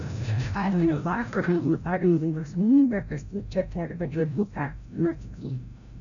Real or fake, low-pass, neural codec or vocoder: fake; 7.2 kHz; codec, 16 kHz, 0.5 kbps, FreqCodec, larger model